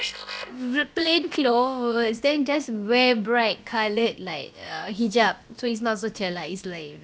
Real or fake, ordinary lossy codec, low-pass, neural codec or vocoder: fake; none; none; codec, 16 kHz, about 1 kbps, DyCAST, with the encoder's durations